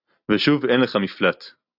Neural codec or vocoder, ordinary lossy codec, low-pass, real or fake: none; AAC, 48 kbps; 5.4 kHz; real